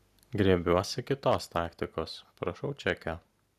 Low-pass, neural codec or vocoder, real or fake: 14.4 kHz; none; real